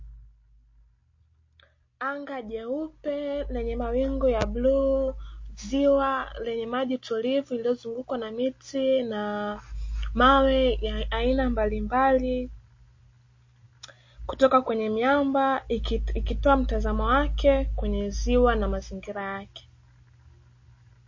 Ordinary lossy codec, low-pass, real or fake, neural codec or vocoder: MP3, 32 kbps; 7.2 kHz; real; none